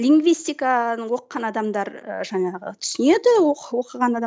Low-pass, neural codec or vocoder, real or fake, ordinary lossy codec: none; none; real; none